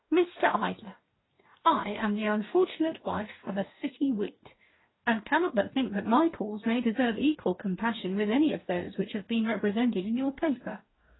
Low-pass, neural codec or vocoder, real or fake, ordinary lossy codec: 7.2 kHz; codec, 44.1 kHz, 2.6 kbps, DAC; fake; AAC, 16 kbps